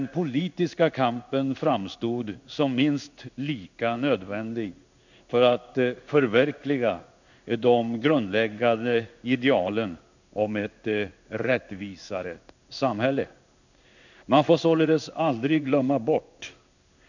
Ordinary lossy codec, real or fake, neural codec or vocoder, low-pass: none; fake; codec, 16 kHz in and 24 kHz out, 1 kbps, XY-Tokenizer; 7.2 kHz